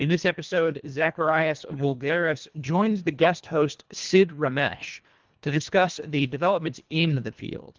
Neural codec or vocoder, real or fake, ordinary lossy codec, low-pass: codec, 24 kHz, 1.5 kbps, HILCodec; fake; Opus, 32 kbps; 7.2 kHz